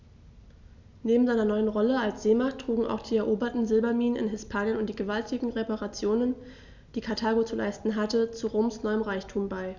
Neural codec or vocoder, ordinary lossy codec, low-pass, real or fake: none; none; 7.2 kHz; real